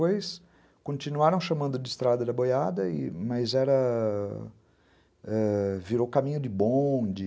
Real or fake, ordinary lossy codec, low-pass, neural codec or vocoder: real; none; none; none